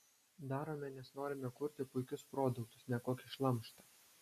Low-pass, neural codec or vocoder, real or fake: 14.4 kHz; none; real